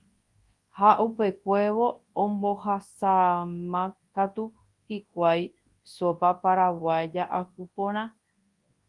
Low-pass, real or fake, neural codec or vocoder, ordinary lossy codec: 10.8 kHz; fake; codec, 24 kHz, 0.9 kbps, WavTokenizer, large speech release; Opus, 24 kbps